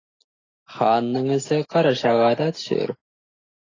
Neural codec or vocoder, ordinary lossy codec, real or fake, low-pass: none; AAC, 32 kbps; real; 7.2 kHz